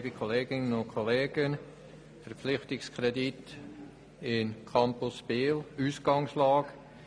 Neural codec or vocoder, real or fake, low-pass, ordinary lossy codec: none; real; none; none